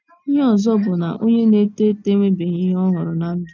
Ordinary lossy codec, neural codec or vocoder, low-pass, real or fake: none; none; 7.2 kHz; real